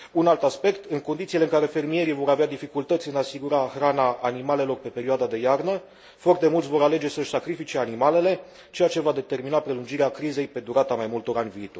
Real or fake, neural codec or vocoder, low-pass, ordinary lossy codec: real; none; none; none